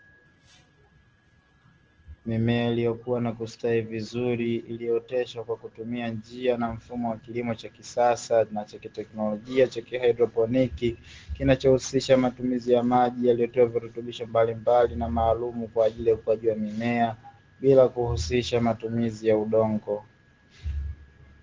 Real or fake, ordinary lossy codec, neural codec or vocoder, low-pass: real; Opus, 16 kbps; none; 7.2 kHz